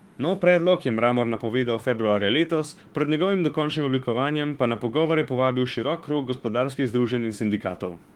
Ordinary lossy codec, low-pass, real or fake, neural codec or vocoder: Opus, 24 kbps; 19.8 kHz; fake; autoencoder, 48 kHz, 32 numbers a frame, DAC-VAE, trained on Japanese speech